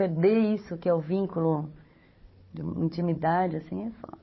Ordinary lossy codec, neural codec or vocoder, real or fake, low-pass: MP3, 24 kbps; codec, 16 kHz, 4 kbps, FunCodec, trained on Chinese and English, 50 frames a second; fake; 7.2 kHz